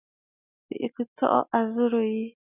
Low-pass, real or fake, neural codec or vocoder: 3.6 kHz; real; none